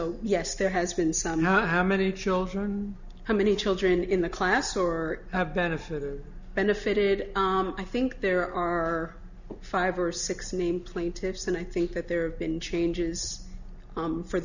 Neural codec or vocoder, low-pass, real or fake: none; 7.2 kHz; real